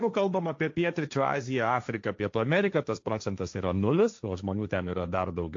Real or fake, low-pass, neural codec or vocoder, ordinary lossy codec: fake; 7.2 kHz; codec, 16 kHz, 1.1 kbps, Voila-Tokenizer; AAC, 48 kbps